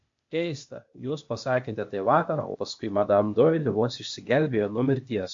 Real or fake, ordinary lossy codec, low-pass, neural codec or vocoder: fake; MP3, 48 kbps; 7.2 kHz; codec, 16 kHz, 0.8 kbps, ZipCodec